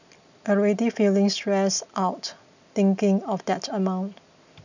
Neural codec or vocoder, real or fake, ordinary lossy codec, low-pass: none; real; none; 7.2 kHz